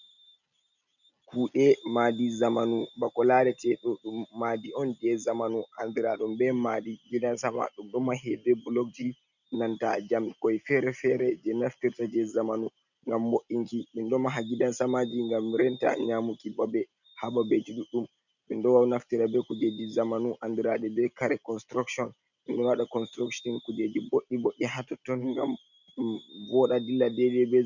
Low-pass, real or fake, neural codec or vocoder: 7.2 kHz; real; none